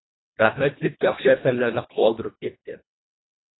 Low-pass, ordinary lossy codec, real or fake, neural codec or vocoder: 7.2 kHz; AAC, 16 kbps; fake; codec, 24 kHz, 1.5 kbps, HILCodec